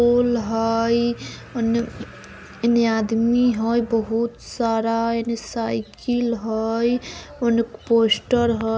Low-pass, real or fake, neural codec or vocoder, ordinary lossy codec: none; real; none; none